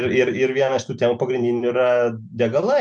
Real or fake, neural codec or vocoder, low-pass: real; none; 9.9 kHz